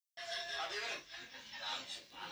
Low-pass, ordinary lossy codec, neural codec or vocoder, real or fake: none; none; none; real